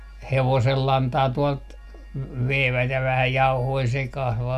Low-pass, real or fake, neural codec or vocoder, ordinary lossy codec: 14.4 kHz; real; none; none